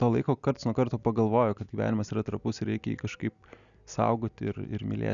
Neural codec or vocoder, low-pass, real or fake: none; 7.2 kHz; real